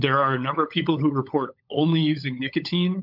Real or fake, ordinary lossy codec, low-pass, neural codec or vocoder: fake; MP3, 48 kbps; 5.4 kHz; codec, 16 kHz, 16 kbps, FunCodec, trained on LibriTTS, 50 frames a second